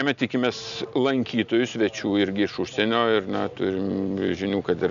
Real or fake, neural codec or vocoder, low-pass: real; none; 7.2 kHz